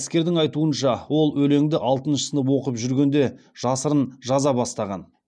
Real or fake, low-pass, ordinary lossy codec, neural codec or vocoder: real; 9.9 kHz; none; none